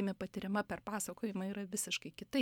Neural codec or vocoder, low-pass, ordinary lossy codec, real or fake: none; 19.8 kHz; MP3, 96 kbps; real